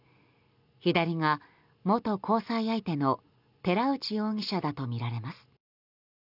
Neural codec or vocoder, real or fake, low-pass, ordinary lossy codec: none; real; 5.4 kHz; none